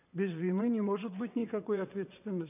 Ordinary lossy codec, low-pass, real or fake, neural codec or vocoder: none; 3.6 kHz; fake; vocoder, 22.05 kHz, 80 mel bands, WaveNeXt